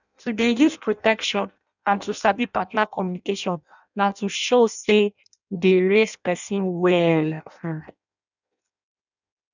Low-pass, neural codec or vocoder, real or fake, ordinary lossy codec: 7.2 kHz; codec, 16 kHz in and 24 kHz out, 0.6 kbps, FireRedTTS-2 codec; fake; none